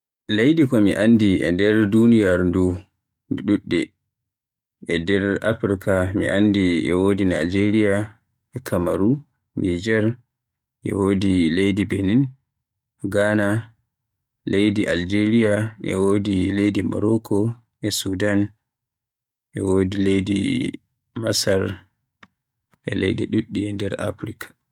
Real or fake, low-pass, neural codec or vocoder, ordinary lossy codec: fake; 19.8 kHz; codec, 44.1 kHz, 7.8 kbps, DAC; MP3, 96 kbps